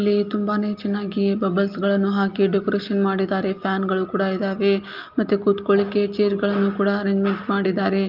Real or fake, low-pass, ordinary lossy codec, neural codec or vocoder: real; 5.4 kHz; Opus, 24 kbps; none